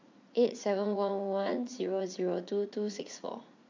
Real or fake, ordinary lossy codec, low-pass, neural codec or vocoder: fake; MP3, 64 kbps; 7.2 kHz; vocoder, 44.1 kHz, 80 mel bands, Vocos